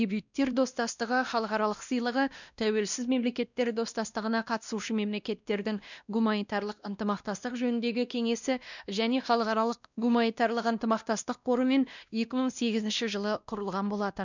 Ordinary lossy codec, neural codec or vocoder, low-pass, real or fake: none; codec, 16 kHz, 1 kbps, X-Codec, WavLM features, trained on Multilingual LibriSpeech; 7.2 kHz; fake